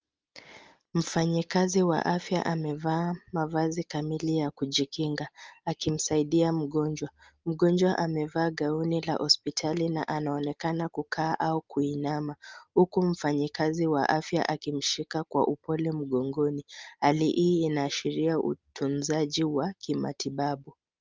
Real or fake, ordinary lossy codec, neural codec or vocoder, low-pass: real; Opus, 24 kbps; none; 7.2 kHz